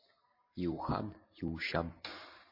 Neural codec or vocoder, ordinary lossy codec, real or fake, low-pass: none; MP3, 24 kbps; real; 5.4 kHz